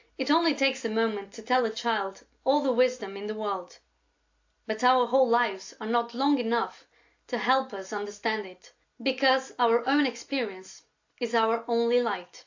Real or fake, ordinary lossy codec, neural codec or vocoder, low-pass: real; AAC, 48 kbps; none; 7.2 kHz